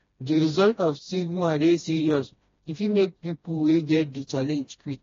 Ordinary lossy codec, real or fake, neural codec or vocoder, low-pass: AAC, 32 kbps; fake; codec, 16 kHz, 1 kbps, FreqCodec, smaller model; 7.2 kHz